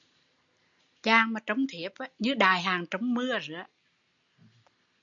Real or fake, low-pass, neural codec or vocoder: real; 7.2 kHz; none